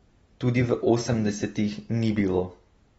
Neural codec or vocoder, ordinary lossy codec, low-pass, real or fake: none; AAC, 24 kbps; 19.8 kHz; real